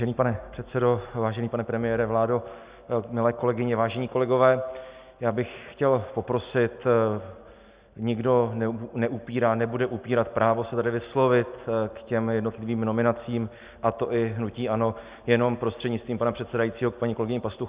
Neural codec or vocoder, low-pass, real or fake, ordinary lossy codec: none; 3.6 kHz; real; Opus, 64 kbps